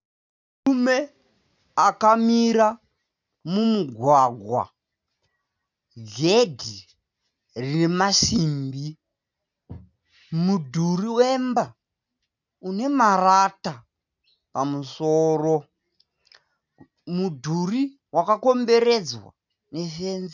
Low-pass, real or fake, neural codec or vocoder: 7.2 kHz; real; none